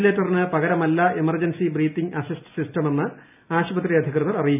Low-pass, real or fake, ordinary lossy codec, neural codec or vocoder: 3.6 kHz; real; none; none